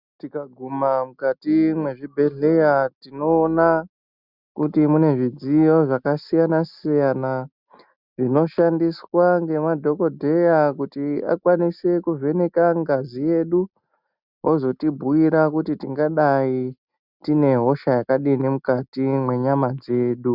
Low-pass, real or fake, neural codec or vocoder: 5.4 kHz; real; none